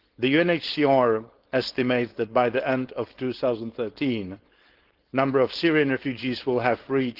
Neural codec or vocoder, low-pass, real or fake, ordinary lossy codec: codec, 16 kHz, 4.8 kbps, FACodec; 5.4 kHz; fake; Opus, 16 kbps